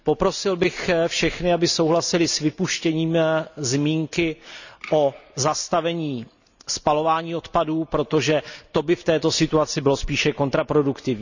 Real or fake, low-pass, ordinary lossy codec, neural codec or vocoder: real; 7.2 kHz; none; none